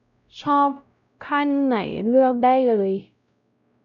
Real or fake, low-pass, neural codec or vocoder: fake; 7.2 kHz; codec, 16 kHz, 0.5 kbps, X-Codec, WavLM features, trained on Multilingual LibriSpeech